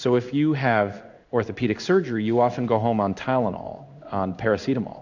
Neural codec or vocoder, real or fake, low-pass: codec, 16 kHz in and 24 kHz out, 1 kbps, XY-Tokenizer; fake; 7.2 kHz